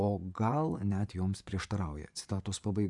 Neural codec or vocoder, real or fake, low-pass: vocoder, 24 kHz, 100 mel bands, Vocos; fake; 10.8 kHz